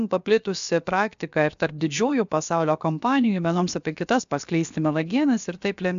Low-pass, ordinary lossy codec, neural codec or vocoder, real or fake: 7.2 kHz; AAC, 96 kbps; codec, 16 kHz, about 1 kbps, DyCAST, with the encoder's durations; fake